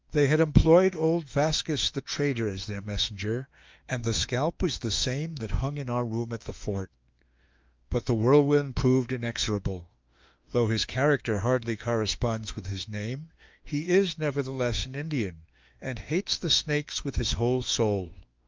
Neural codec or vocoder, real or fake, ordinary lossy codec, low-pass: autoencoder, 48 kHz, 32 numbers a frame, DAC-VAE, trained on Japanese speech; fake; Opus, 24 kbps; 7.2 kHz